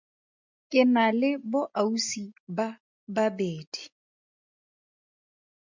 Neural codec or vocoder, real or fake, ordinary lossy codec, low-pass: none; real; MP3, 64 kbps; 7.2 kHz